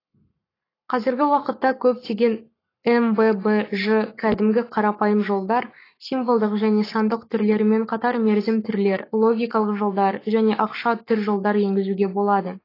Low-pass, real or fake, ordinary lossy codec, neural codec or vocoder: 5.4 kHz; fake; AAC, 24 kbps; codec, 44.1 kHz, 7.8 kbps, Pupu-Codec